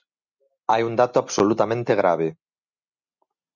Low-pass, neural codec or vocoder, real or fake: 7.2 kHz; none; real